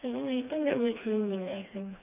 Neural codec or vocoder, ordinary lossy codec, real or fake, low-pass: codec, 16 kHz, 2 kbps, FreqCodec, smaller model; none; fake; 3.6 kHz